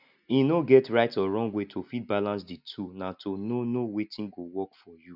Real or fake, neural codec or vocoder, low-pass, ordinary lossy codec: real; none; 5.4 kHz; none